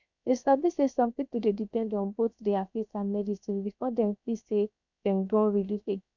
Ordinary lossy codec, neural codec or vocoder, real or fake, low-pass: none; codec, 16 kHz, 0.7 kbps, FocalCodec; fake; 7.2 kHz